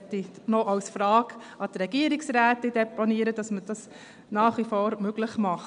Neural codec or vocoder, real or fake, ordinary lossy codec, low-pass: none; real; none; 9.9 kHz